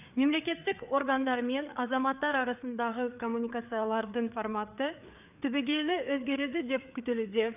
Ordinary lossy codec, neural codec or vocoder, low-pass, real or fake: none; codec, 16 kHz, 4 kbps, FreqCodec, larger model; 3.6 kHz; fake